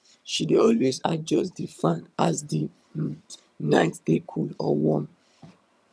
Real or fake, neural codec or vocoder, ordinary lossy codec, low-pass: fake; vocoder, 22.05 kHz, 80 mel bands, HiFi-GAN; none; none